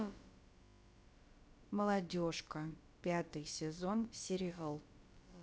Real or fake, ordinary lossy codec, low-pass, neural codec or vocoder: fake; none; none; codec, 16 kHz, about 1 kbps, DyCAST, with the encoder's durations